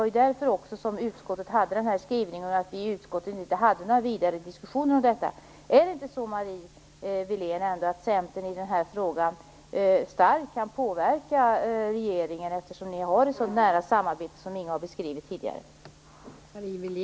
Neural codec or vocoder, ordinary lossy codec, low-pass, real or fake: none; none; none; real